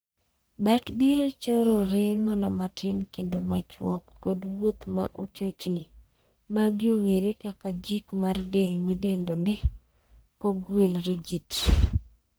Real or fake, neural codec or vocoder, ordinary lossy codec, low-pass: fake; codec, 44.1 kHz, 1.7 kbps, Pupu-Codec; none; none